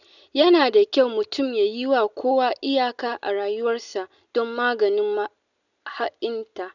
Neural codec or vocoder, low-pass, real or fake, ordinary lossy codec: none; 7.2 kHz; real; none